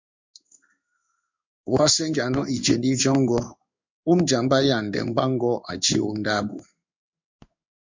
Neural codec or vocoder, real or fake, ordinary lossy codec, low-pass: codec, 16 kHz in and 24 kHz out, 1 kbps, XY-Tokenizer; fake; MP3, 64 kbps; 7.2 kHz